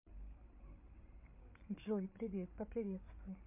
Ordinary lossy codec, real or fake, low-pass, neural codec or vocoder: MP3, 32 kbps; fake; 3.6 kHz; codec, 16 kHz, 8 kbps, FreqCodec, larger model